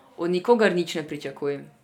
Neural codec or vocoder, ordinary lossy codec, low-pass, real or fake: none; none; 19.8 kHz; real